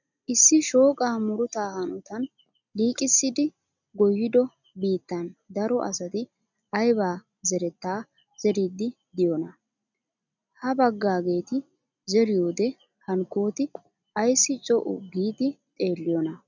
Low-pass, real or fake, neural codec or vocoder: 7.2 kHz; real; none